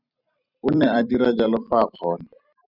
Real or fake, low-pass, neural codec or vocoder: fake; 5.4 kHz; vocoder, 44.1 kHz, 128 mel bands every 256 samples, BigVGAN v2